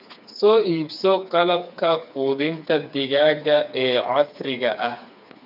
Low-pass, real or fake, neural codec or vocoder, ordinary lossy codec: 5.4 kHz; fake; codec, 16 kHz, 4 kbps, FreqCodec, smaller model; none